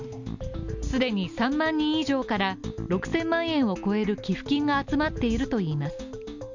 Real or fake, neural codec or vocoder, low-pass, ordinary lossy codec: real; none; 7.2 kHz; none